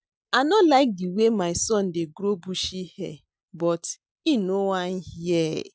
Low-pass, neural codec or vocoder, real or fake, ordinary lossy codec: none; none; real; none